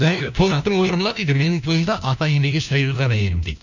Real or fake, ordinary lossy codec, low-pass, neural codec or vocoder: fake; none; 7.2 kHz; codec, 16 kHz, 1 kbps, FunCodec, trained on LibriTTS, 50 frames a second